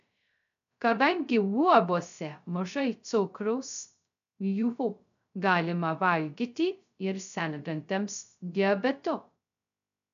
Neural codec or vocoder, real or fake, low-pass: codec, 16 kHz, 0.2 kbps, FocalCodec; fake; 7.2 kHz